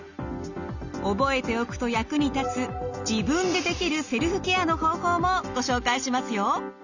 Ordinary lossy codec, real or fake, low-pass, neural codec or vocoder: none; real; 7.2 kHz; none